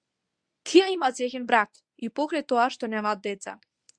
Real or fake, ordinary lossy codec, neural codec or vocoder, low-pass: fake; Opus, 64 kbps; codec, 24 kHz, 0.9 kbps, WavTokenizer, medium speech release version 1; 9.9 kHz